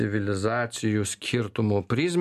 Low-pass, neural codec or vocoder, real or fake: 14.4 kHz; none; real